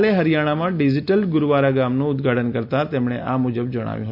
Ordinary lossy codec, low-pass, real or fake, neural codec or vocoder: none; 5.4 kHz; real; none